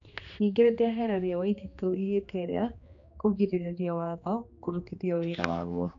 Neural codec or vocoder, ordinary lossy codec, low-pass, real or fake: codec, 16 kHz, 2 kbps, X-Codec, HuBERT features, trained on general audio; none; 7.2 kHz; fake